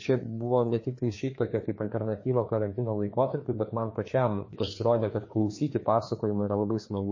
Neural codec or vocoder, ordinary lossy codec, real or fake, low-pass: codec, 16 kHz, 2 kbps, FreqCodec, larger model; MP3, 32 kbps; fake; 7.2 kHz